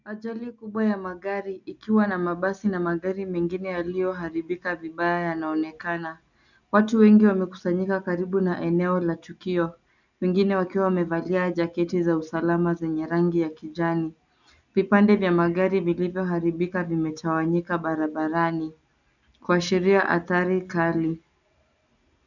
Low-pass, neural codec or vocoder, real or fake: 7.2 kHz; none; real